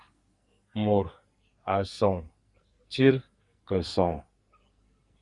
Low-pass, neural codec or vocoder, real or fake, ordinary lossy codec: 10.8 kHz; codec, 44.1 kHz, 2.6 kbps, SNAC; fake; Opus, 64 kbps